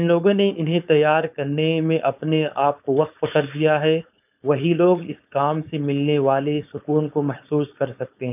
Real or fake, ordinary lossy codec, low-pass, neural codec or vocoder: fake; none; 3.6 kHz; codec, 16 kHz, 4.8 kbps, FACodec